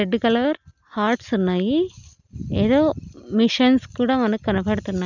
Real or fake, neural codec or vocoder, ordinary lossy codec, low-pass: real; none; none; 7.2 kHz